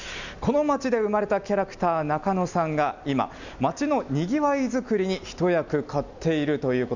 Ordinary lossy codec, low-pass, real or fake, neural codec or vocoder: none; 7.2 kHz; real; none